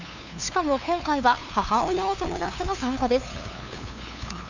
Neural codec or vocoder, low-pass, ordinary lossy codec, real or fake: codec, 16 kHz, 2 kbps, FunCodec, trained on LibriTTS, 25 frames a second; 7.2 kHz; none; fake